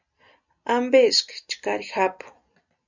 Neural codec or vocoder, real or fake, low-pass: none; real; 7.2 kHz